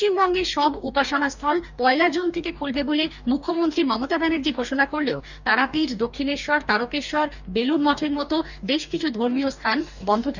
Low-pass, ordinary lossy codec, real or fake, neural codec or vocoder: 7.2 kHz; none; fake; codec, 44.1 kHz, 2.6 kbps, DAC